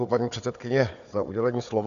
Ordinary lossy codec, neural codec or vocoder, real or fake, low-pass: MP3, 96 kbps; codec, 16 kHz, 4 kbps, FunCodec, trained on Chinese and English, 50 frames a second; fake; 7.2 kHz